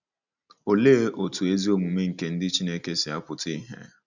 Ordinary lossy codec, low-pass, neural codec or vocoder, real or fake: none; 7.2 kHz; none; real